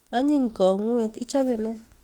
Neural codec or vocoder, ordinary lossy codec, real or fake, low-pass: autoencoder, 48 kHz, 32 numbers a frame, DAC-VAE, trained on Japanese speech; Opus, 32 kbps; fake; 19.8 kHz